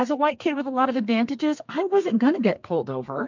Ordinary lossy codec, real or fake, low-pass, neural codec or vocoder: MP3, 64 kbps; fake; 7.2 kHz; codec, 32 kHz, 1.9 kbps, SNAC